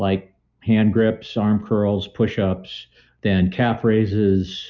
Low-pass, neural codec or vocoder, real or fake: 7.2 kHz; none; real